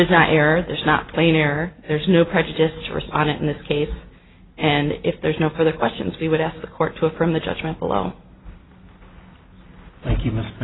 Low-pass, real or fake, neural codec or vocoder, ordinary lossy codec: 7.2 kHz; real; none; AAC, 16 kbps